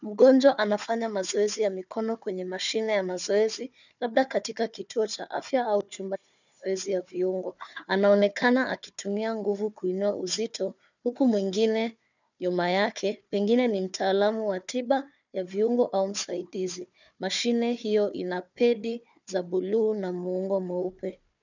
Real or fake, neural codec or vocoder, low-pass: fake; codec, 16 kHz, 4 kbps, FunCodec, trained on Chinese and English, 50 frames a second; 7.2 kHz